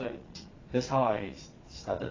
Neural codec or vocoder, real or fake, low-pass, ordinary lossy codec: vocoder, 22.05 kHz, 80 mel bands, Vocos; fake; 7.2 kHz; AAC, 32 kbps